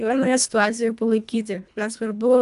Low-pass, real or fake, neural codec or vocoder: 10.8 kHz; fake; codec, 24 kHz, 1.5 kbps, HILCodec